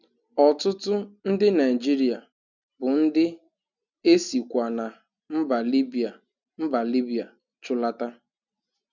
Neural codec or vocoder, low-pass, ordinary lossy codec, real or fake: none; 7.2 kHz; none; real